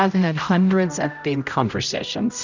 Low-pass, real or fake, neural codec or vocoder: 7.2 kHz; fake; codec, 16 kHz, 0.5 kbps, X-Codec, HuBERT features, trained on general audio